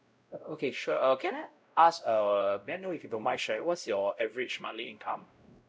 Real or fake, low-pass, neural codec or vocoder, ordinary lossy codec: fake; none; codec, 16 kHz, 0.5 kbps, X-Codec, WavLM features, trained on Multilingual LibriSpeech; none